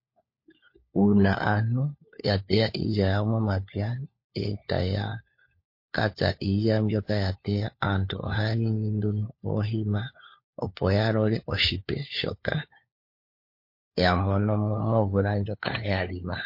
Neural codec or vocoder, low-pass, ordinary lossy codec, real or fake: codec, 16 kHz, 4 kbps, FunCodec, trained on LibriTTS, 50 frames a second; 5.4 kHz; MP3, 32 kbps; fake